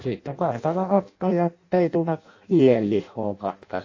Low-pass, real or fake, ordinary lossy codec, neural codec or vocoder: 7.2 kHz; fake; AAC, 48 kbps; codec, 16 kHz in and 24 kHz out, 0.6 kbps, FireRedTTS-2 codec